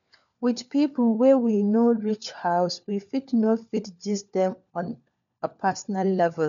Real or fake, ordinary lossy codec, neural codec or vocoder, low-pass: fake; none; codec, 16 kHz, 4 kbps, FunCodec, trained on LibriTTS, 50 frames a second; 7.2 kHz